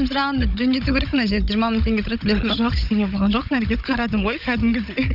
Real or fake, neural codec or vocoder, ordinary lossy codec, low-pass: fake; codec, 16 kHz, 16 kbps, FunCodec, trained on Chinese and English, 50 frames a second; none; 5.4 kHz